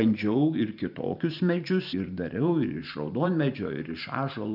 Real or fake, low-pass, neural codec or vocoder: fake; 5.4 kHz; vocoder, 44.1 kHz, 128 mel bands every 512 samples, BigVGAN v2